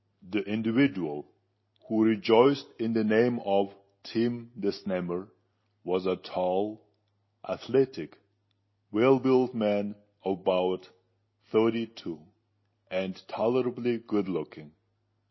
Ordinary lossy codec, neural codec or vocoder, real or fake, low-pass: MP3, 24 kbps; none; real; 7.2 kHz